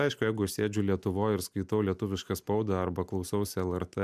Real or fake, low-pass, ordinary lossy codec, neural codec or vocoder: real; 14.4 kHz; MP3, 96 kbps; none